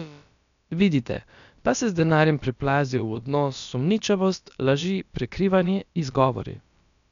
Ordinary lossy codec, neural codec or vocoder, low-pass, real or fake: none; codec, 16 kHz, about 1 kbps, DyCAST, with the encoder's durations; 7.2 kHz; fake